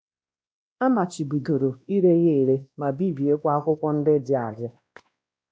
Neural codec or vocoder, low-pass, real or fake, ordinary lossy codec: codec, 16 kHz, 1 kbps, X-Codec, WavLM features, trained on Multilingual LibriSpeech; none; fake; none